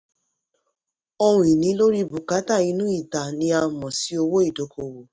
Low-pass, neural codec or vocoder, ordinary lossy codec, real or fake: none; none; none; real